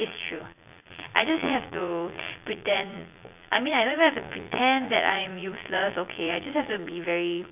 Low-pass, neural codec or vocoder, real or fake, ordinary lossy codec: 3.6 kHz; vocoder, 22.05 kHz, 80 mel bands, Vocos; fake; none